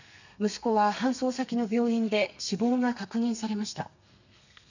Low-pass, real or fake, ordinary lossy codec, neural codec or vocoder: 7.2 kHz; fake; none; codec, 32 kHz, 1.9 kbps, SNAC